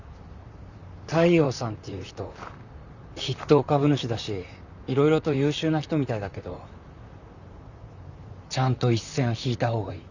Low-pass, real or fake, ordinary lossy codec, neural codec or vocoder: 7.2 kHz; fake; none; vocoder, 44.1 kHz, 128 mel bands, Pupu-Vocoder